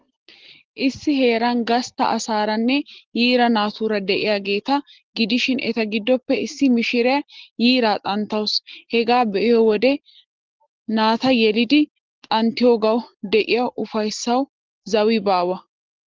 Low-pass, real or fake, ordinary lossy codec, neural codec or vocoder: 7.2 kHz; real; Opus, 16 kbps; none